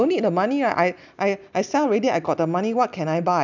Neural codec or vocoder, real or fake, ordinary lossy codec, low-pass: none; real; none; 7.2 kHz